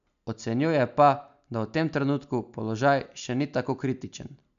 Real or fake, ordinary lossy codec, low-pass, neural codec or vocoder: real; MP3, 96 kbps; 7.2 kHz; none